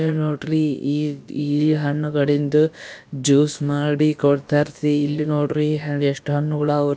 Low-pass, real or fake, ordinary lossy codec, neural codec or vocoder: none; fake; none; codec, 16 kHz, about 1 kbps, DyCAST, with the encoder's durations